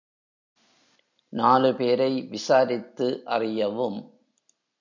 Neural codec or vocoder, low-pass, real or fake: none; 7.2 kHz; real